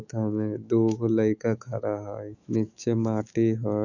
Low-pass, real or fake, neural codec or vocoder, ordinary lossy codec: 7.2 kHz; fake; autoencoder, 48 kHz, 128 numbers a frame, DAC-VAE, trained on Japanese speech; none